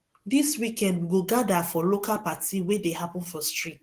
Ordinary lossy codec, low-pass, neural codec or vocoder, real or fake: Opus, 16 kbps; 14.4 kHz; none; real